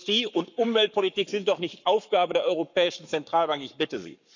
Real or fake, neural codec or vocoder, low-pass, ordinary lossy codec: fake; codec, 44.1 kHz, 7.8 kbps, Pupu-Codec; 7.2 kHz; none